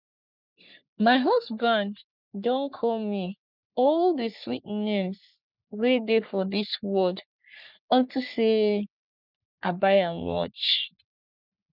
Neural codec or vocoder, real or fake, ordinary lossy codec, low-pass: codec, 44.1 kHz, 3.4 kbps, Pupu-Codec; fake; none; 5.4 kHz